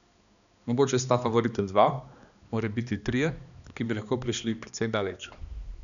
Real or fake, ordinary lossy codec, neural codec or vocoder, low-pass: fake; none; codec, 16 kHz, 2 kbps, X-Codec, HuBERT features, trained on balanced general audio; 7.2 kHz